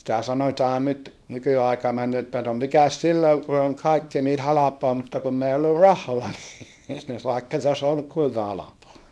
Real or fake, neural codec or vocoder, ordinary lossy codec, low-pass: fake; codec, 24 kHz, 0.9 kbps, WavTokenizer, small release; none; none